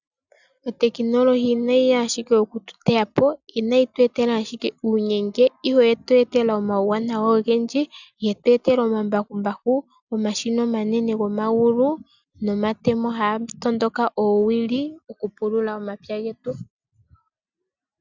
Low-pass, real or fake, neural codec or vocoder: 7.2 kHz; real; none